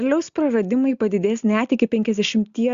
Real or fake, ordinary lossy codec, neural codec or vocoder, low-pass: real; Opus, 64 kbps; none; 7.2 kHz